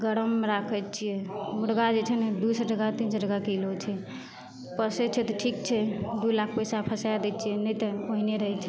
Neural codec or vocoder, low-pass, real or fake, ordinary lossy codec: none; none; real; none